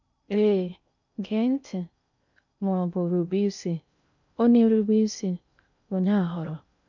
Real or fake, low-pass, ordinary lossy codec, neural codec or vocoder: fake; 7.2 kHz; none; codec, 16 kHz in and 24 kHz out, 0.6 kbps, FocalCodec, streaming, 2048 codes